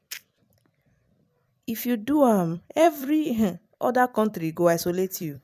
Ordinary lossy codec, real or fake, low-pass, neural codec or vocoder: none; fake; 14.4 kHz; vocoder, 44.1 kHz, 128 mel bands every 256 samples, BigVGAN v2